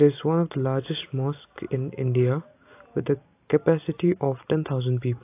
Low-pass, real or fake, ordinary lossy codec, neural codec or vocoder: 3.6 kHz; real; AAC, 24 kbps; none